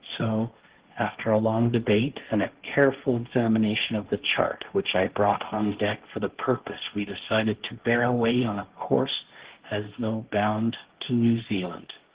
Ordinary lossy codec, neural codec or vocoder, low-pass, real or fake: Opus, 16 kbps; codec, 16 kHz, 1.1 kbps, Voila-Tokenizer; 3.6 kHz; fake